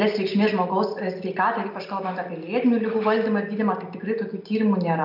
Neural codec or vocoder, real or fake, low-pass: none; real; 5.4 kHz